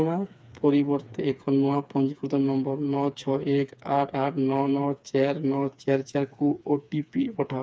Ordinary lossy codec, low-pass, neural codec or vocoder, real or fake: none; none; codec, 16 kHz, 4 kbps, FreqCodec, smaller model; fake